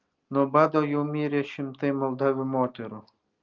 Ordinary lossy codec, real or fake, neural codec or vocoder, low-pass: Opus, 24 kbps; real; none; 7.2 kHz